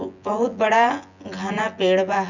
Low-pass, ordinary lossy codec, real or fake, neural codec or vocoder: 7.2 kHz; none; fake; vocoder, 24 kHz, 100 mel bands, Vocos